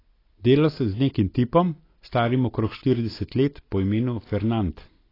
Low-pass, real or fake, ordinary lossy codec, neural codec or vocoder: 5.4 kHz; real; AAC, 24 kbps; none